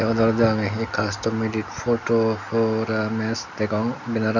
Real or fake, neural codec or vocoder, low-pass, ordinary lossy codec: real; none; 7.2 kHz; none